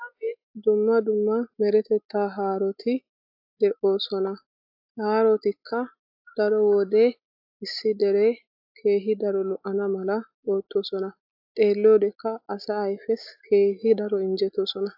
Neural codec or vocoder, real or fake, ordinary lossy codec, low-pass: none; real; AAC, 48 kbps; 5.4 kHz